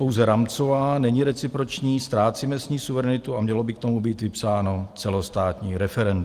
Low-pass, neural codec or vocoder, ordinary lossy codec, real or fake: 14.4 kHz; none; Opus, 24 kbps; real